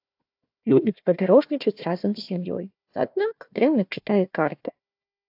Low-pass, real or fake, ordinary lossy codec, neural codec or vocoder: 5.4 kHz; fake; AAC, 48 kbps; codec, 16 kHz, 1 kbps, FunCodec, trained on Chinese and English, 50 frames a second